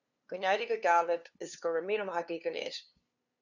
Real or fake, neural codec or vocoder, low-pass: fake; codec, 16 kHz, 2 kbps, FunCodec, trained on LibriTTS, 25 frames a second; 7.2 kHz